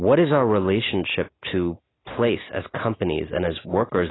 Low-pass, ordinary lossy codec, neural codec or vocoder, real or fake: 7.2 kHz; AAC, 16 kbps; none; real